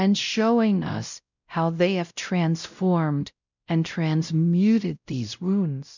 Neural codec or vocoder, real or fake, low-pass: codec, 16 kHz, 0.5 kbps, X-Codec, WavLM features, trained on Multilingual LibriSpeech; fake; 7.2 kHz